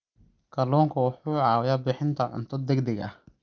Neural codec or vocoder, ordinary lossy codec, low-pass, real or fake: none; Opus, 24 kbps; 7.2 kHz; real